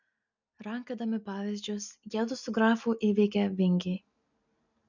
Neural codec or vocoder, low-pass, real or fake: none; 7.2 kHz; real